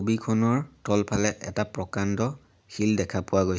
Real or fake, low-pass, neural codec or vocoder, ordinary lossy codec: real; none; none; none